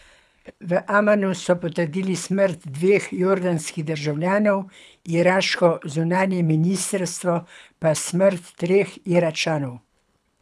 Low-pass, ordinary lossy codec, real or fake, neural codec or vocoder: none; none; fake; codec, 24 kHz, 6 kbps, HILCodec